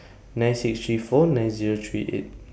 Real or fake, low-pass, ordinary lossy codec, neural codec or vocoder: real; none; none; none